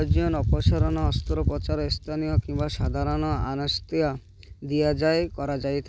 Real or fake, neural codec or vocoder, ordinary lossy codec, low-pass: real; none; none; none